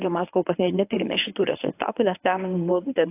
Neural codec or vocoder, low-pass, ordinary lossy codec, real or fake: codec, 24 kHz, 0.9 kbps, WavTokenizer, medium speech release version 1; 3.6 kHz; AAC, 24 kbps; fake